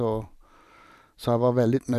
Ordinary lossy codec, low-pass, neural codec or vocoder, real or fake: none; 14.4 kHz; vocoder, 44.1 kHz, 128 mel bands every 512 samples, BigVGAN v2; fake